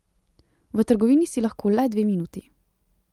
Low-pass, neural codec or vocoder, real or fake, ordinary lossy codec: 19.8 kHz; none; real; Opus, 32 kbps